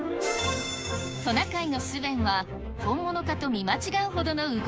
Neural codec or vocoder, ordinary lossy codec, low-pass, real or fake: codec, 16 kHz, 6 kbps, DAC; none; none; fake